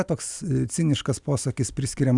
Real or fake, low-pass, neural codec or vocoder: real; 10.8 kHz; none